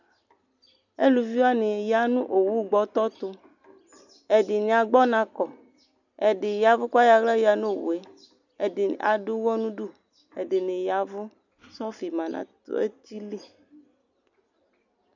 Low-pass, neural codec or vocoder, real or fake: 7.2 kHz; none; real